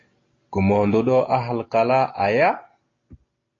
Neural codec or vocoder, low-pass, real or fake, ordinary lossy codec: none; 7.2 kHz; real; AAC, 32 kbps